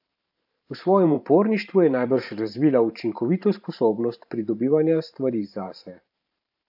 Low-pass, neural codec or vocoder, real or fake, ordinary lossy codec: 5.4 kHz; vocoder, 44.1 kHz, 128 mel bands every 512 samples, BigVGAN v2; fake; AAC, 48 kbps